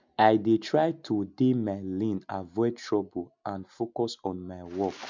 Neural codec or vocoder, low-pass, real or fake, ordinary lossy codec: none; 7.2 kHz; real; MP3, 64 kbps